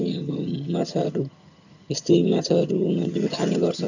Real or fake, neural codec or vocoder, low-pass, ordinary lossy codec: fake; vocoder, 22.05 kHz, 80 mel bands, HiFi-GAN; 7.2 kHz; none